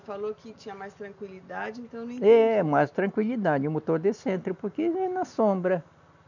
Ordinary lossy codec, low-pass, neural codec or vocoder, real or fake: none; 7.2 kHz; none; real